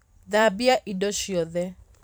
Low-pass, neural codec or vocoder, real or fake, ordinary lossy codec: none; vocoder, 44.1 kHz, 128 mel bands every 512 samples, BigVGAN v2; fake; none